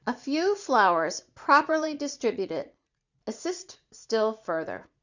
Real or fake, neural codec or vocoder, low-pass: fake; vocoder, 44.1 kHz, 80 mel bands, Vocos; 7.2 kHz